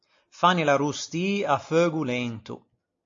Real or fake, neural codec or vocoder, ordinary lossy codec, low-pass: real; none; AAC, 48 kbps; 7.2 kHz